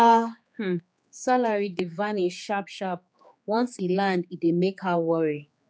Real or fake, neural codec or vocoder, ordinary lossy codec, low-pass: fake; codec, 16 kHz, 4 kbps, X-Codec, HuBERT features, trained on general audio; none; none